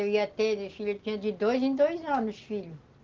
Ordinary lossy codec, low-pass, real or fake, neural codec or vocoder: Opus, 16 kbps; 7.2 kHz; real; none